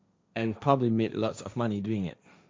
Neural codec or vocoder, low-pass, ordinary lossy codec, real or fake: codec, 16 kHz, 1.1 kbps, Voila-Tokenizer; none; none; fake